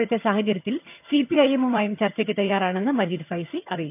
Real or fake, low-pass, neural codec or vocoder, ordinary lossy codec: fake; 3.6 kHz; vocoder, 22.05 kHz, 80 mel bands, HiFi-GAN; none